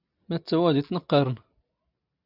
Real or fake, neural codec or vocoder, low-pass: real; none; 5.4 kHz